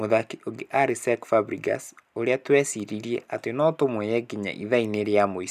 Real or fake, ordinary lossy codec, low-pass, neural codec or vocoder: real; none; 14.4 kHz; none